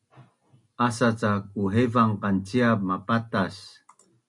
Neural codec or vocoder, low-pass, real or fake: none; 10.8 kHz; real